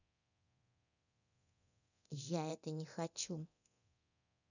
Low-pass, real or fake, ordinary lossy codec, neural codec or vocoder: 7.2 kHz; fake; none; codec, 24 kHz, 0.9 kbps, DualCodec